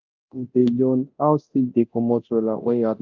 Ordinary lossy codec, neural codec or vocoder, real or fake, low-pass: Opus, 32 kbps; codec, 24 kHz, 0.9 kbps, DualCodec; fake; 7.2 kHz